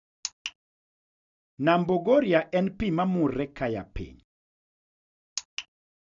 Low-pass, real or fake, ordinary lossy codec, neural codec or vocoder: 7.2 kHz; real; none; none